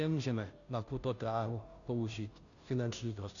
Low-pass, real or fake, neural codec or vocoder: 7.2 kHz; fake; codec, 16 kHz, 0.5 kbps, FunCodec, trained on Chinese and English, 25 frames a second